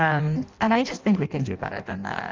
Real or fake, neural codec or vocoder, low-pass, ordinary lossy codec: fake; codec, 16 kHz in and 24 kHz out, 0.6 kbps, FireRedTTS-2 codec; 7.2 kHz; Opus, 32 kbps